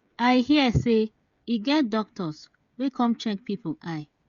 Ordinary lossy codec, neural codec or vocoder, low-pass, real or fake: Opus, 64 kbps; codec, 16 kHz, 16 kbps, FreqCodec, smaller model; 7.2 kHz; fake